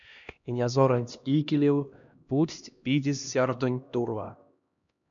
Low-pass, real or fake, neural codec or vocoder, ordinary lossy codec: 7.2 kHz; fake; codec, 16 kHz, 1 kbps, X-Codec, HuBERT features, trained on LibriSpeech; MP3, 96 kbps